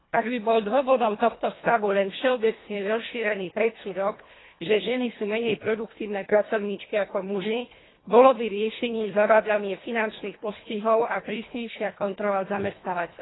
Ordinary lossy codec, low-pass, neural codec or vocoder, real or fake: AAC, 16 kbps; 7.2 kHz; codec, 24 kHz, 1.5 kbps, HILCodec; fake